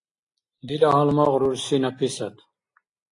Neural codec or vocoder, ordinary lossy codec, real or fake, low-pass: none; AAC, 48 kbps; real; 10.8 kHz